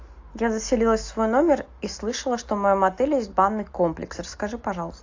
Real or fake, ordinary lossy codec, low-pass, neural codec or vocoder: real; AAC, 48 kbps; 7.2 kHz; none